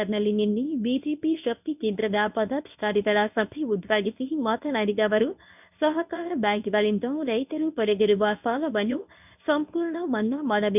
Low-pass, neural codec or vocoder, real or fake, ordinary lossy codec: 3.6 kHz; codec, 24 kHz, 0.9 kbps, WavTokenizer, medium speech release version 1; fake; none